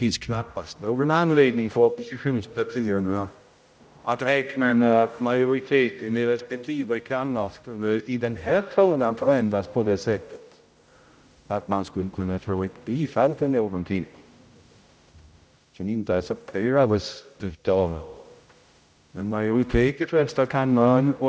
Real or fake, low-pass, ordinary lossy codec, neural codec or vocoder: fake; none; none; codec, 16 kHz, 0.5 kbps, X-Codec, HuBERT features, trained on general audio